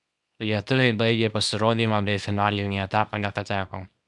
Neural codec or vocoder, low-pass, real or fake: codec, 24 kHz, 0.9 kbps, WavTokenizer, small release; 10.8 kHz; fake